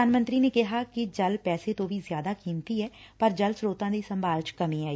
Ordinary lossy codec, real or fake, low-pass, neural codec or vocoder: none; real; none; none